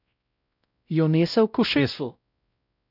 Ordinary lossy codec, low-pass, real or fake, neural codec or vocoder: none; 5.4 kHz; fake; codec, 16 kHz, 0.5 kbps, X-Codec, WavLM features, trained on Multilingual LibriSpeech